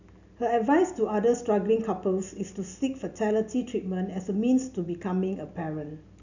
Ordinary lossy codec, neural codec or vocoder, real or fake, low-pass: none; none; real; 7.2 kHz